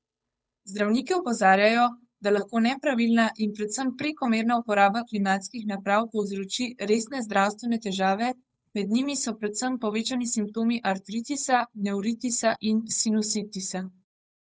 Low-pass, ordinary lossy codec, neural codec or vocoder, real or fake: none; none; codec, 16 kHz, 8 kbps, FunCodec, trained on Chinese and English, 25 frames a second; fake